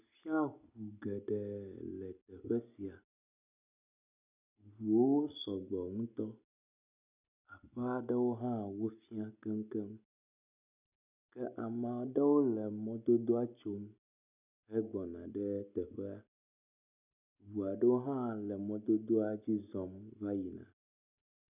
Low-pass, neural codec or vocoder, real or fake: 3.6 kHz; none; real